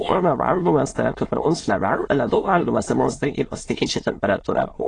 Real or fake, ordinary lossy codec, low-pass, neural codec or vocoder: fake; AAC, 32 kbps; 9.9 kHz; autoencoder, 22.05 kHz, a latent of 192 numbers a frame, VITS, trained on many speakers